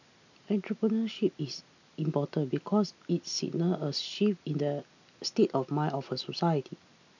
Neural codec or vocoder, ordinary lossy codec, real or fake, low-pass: none; none; real; 7.2 kHz